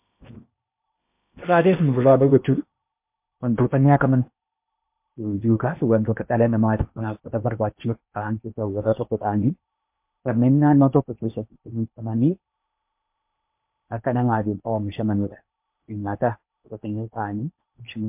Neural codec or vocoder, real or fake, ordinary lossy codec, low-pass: codec, 16 kHz in and 24 kHz out, 0.8 kbps, FocalCodec, streaming, 65536 codes; fake; MP3, 32 kbps; 3.6 kHz